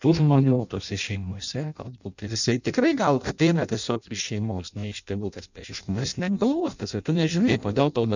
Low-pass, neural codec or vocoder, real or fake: 7.2 kHz; codec, 16 kHz in and 24 kHz out, 0.6 kbps, FireRedTTS-2 codec; fake